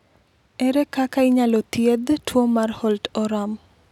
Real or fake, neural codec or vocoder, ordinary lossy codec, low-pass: real; none; none; 19.8 kHz